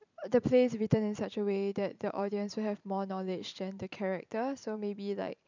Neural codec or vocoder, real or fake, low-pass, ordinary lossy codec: vocoder, 44.1 kHz, 128 mel bands every 512 samples, BigVGAN v2; fake; 7.2 kHz; none